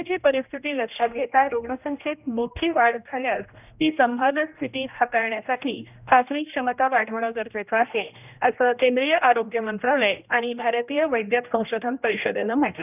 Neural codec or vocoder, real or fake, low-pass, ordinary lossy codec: codec, 16 kHz, 1 kbps, X-Codec, HuBERT features, trained on general audio; fake; 3.6 kHz; none